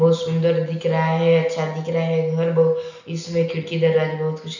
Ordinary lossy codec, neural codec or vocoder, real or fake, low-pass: none; none; real; 7.2 kHz